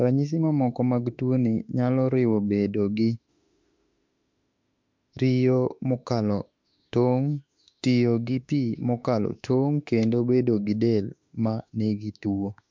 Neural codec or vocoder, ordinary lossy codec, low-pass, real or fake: autoencoder, 48 kHz, 32 numbers a frame, DAC-VAE, trained on Japanese speech; MP3, 64 kbps; 7.2 kHz; fake